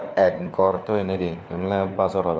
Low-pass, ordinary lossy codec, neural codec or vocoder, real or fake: none; none; codec, 16 kHz, 4 kbps, FunCodec, trained on LibriTTS, 50 frames a second; fake